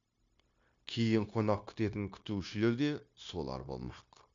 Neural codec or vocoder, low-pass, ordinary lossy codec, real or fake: codec, 16 kHz, 0.9 kbps, LongCat-Audio-Codec; 7.2 kHz; MP3, 64 kbps; fake